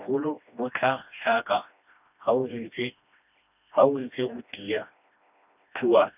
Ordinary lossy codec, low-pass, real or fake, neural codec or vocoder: none; 3.6 kHz; fake; codec, 16 kHz, 1 kbps, FreqCodec, smaller model